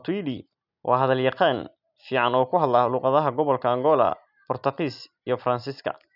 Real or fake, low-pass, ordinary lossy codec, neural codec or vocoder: real; 5.4 kHz; none; none